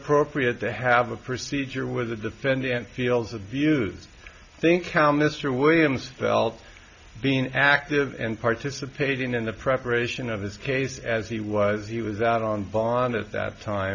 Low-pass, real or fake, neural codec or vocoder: 7.2 kHz; real; none